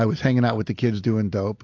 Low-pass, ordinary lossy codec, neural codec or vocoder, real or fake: 7.2 kHz; AAC, 48 kbps; none; real